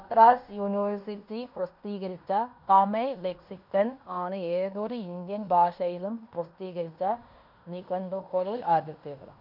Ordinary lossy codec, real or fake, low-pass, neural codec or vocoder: none; fake; 5.4 kHz; codec, 16 kHz in and 24 kHz out, 0.9 kbps, LongCat-Audio-Codec, fine tuned four codebook decoder